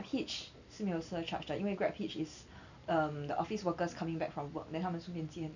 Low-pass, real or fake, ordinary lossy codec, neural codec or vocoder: 7.2 kHz; real; none; none